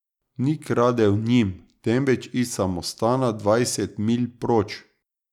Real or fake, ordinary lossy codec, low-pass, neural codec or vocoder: real; none; 19.8 kHz; none